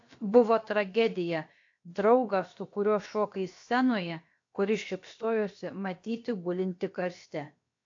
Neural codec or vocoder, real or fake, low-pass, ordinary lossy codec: codec, 16 kHz, about 1 kbps, DyCAST, with the encoder's durations; fake; 7.2 kHz; AAC, 48 kbps